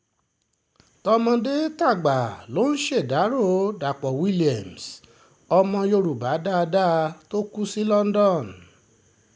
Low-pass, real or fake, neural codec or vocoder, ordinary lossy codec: none; real; none; none